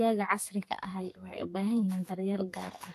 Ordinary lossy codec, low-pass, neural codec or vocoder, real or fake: AAC, 64 kbps; 14.4 kHz; codec, 32 kHz, 1.9 kbps, SNAC; fake